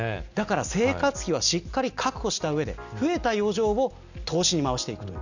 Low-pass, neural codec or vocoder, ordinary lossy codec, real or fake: 7.2 kHz; none; none; real